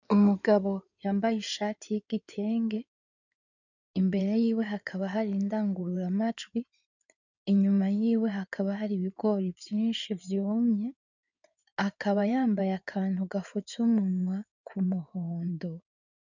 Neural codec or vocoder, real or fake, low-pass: codec, 16 kHz in and 24 kHz out, 2.2 kbps, FireRedTTS-2 codec; fake; 7.2 kHz